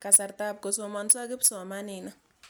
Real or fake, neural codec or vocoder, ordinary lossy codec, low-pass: real; none; none; none